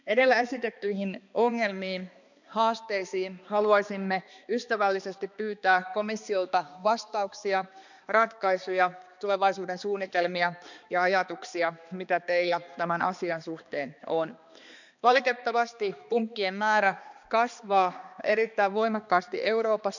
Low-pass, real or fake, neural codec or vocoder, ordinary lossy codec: 7.2 kHz; fake; codec, 16 kHz, 2 kbps, X-Codec, HuBERT features, trained on balanced general audio; none